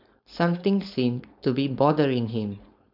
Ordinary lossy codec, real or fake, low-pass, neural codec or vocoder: none; fake; 5.4 kHz; codec, 16 kHz, 4.8 kbps, FACodec